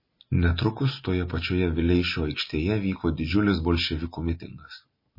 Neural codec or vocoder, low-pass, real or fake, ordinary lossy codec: none; 5.4 kHz; real; MP3, 24 kbps